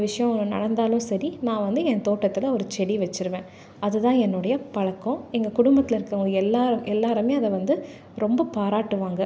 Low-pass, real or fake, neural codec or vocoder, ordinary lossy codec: none; real; none; none